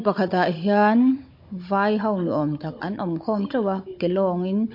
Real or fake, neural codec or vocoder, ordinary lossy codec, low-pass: fake; codec, 16 kHz, 16 kbps, FunCodec, trained on Chinese and English, 50 frames a second; MP3, 32 kbps; 5.4 kHz